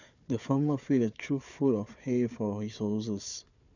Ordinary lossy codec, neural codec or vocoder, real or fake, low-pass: none; codec, 16 kHz, 4 kbps, FunCodec, trained on Chinese and English, 50 frames a second; fake; 7.2 kHz